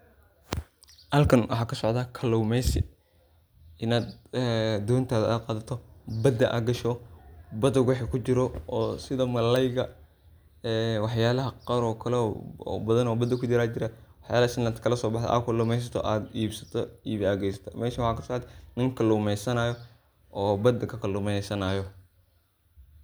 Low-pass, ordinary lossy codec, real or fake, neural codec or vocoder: none; none; real; none